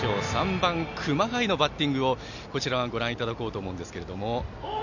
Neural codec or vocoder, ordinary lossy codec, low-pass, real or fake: none; none; 7.2 kHz; real